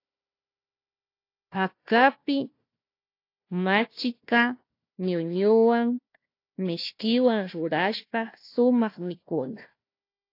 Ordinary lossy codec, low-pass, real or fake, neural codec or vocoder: AAC, 32 kbps; 5.4 kHz; fake; codec, 16 kHz, 1 kbps, FunCodec, trained on Chinese and English, 50 frames a second